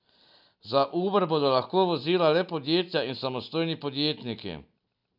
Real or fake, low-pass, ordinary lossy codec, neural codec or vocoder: real; 5.4 kHz; none; none